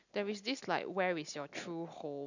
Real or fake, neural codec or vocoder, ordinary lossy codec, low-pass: real; none; none; 7.2 kHz